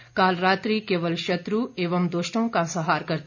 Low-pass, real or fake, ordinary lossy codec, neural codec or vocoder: 7.2 kHz; real; none; none